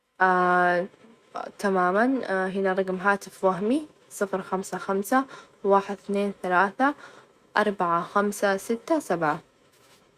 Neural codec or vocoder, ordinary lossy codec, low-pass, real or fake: autoencoder, 48 kHz, 128 numbers a frame, DAC-VAE, trained on Japanese speech; Opus, 64 kbps; 14.4 kHz; fake